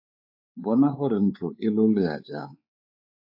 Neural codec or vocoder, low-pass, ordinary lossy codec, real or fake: codec, 16 kHz, 4 kbps, X-Codec, WavLM features, trained on Multilingual LibriSpeech; 5.4 kHz; AAC, 48 kbps; fake